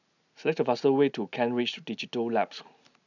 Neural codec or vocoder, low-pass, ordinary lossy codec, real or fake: none; 7.2 kHz; none; real